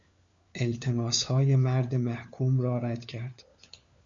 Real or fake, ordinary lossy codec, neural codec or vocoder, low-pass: fake; Opus, 64 kbps; codec, 16 kHz, 4 kbps, FunCodec, trained on LibriTTS, 50 frames a second; 7.2 kHz